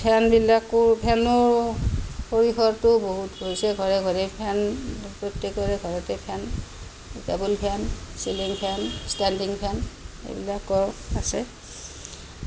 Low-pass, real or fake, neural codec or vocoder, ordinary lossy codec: none; real; none; none